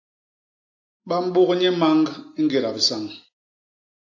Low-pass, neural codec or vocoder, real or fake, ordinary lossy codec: 7.2 kHz; none; real; AAC, 48 kbps